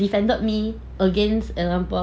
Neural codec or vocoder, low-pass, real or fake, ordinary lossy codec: none; none; real; none